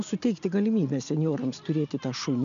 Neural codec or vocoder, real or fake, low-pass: none; real; 7.2 kHz